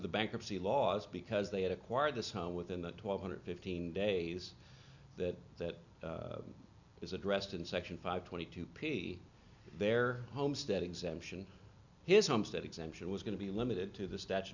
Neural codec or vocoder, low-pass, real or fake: none; 7.2 kHz; real